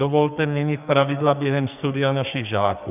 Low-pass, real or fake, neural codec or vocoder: 3.6 kHz; fake; codec, 44.1 kHz, 2.6 kbps, SNAC